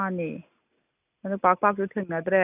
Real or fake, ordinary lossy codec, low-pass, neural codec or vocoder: real; none; 3.6 kHz; none